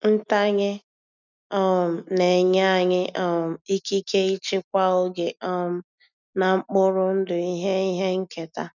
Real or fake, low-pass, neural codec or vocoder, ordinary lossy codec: real; 7.2 kHz; none; none